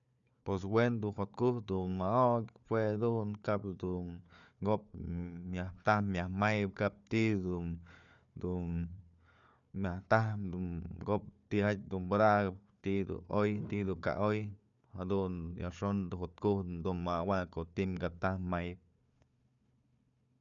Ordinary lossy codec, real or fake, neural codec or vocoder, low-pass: none; fake; codec, 16 kHz, 4 kbps, FunCodec, trained on Chinese and English, 50 frames a second; 7.2 kHz